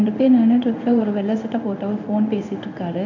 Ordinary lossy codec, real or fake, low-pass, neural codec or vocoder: none; fake; 7.2 kHz; codec, 16 kHz in and 24 kHz out, 1 kbps, XY-Tokenizer